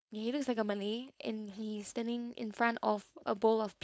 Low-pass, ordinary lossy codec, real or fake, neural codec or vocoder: none; none; fake; codec, 16 kHz, 4.8 kbps, FACodec